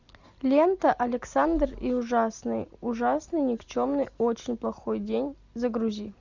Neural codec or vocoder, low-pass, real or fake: none; 7.2 kHz; real